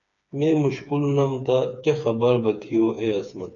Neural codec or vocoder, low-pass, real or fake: codec, 16 kHz, 4 kbps, FreqCodec, smaller model; 7.2 kHz; fake